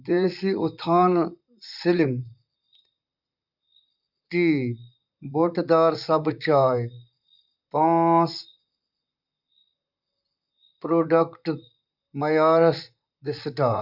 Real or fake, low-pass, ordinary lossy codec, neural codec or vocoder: fake; 5.4 kHz; Opus, 64 kbps; vocoder, 44.1 kHz, 128 mel bands, Pupu-Vocoder